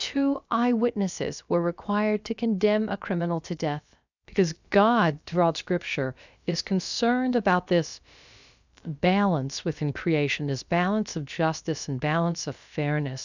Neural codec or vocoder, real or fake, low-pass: codec, 16 kHz, about 1 kbps, DyCAST, with the encoder's durations; fake; 7.2 kHz